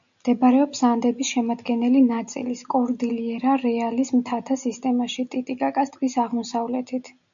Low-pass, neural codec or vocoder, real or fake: 7.2 kHz; none; real